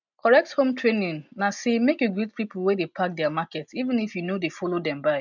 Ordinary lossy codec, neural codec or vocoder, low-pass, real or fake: none; none; 7.2 kHz; real